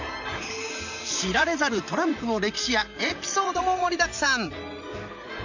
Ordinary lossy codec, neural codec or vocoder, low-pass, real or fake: none; vocoder, 44.1 kHz, 128 mel bands, Pupu-Vocoder; 7.2 kHz; fake